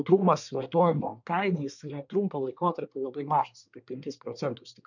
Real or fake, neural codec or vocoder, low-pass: fake; codec, 24 kHz, 1 kbps, SNAC; 7.2 kHz